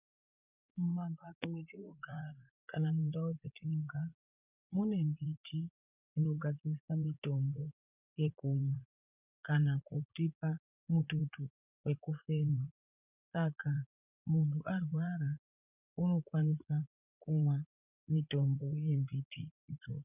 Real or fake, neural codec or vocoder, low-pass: fake; vocoder, 44.1 kHz, 80 mel bands, Vocos; 3.6 kHz